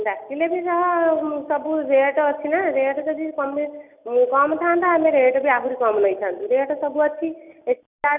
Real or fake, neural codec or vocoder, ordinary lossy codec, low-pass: real; none; none; 3.6 kHz